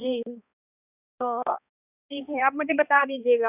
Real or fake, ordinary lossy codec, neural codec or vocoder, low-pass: fake; none; codec, 16 kHz, 2 kbps, X-Codec, HuBERT features, trained on balanced general audio; 3.6 kHz